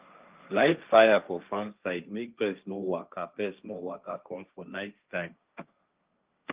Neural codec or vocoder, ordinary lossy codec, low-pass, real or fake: codec, 16 kHz, 1.1 kbps, Voila-Tokenizer; Opus, 24 kbps; 3.6 kHz; fake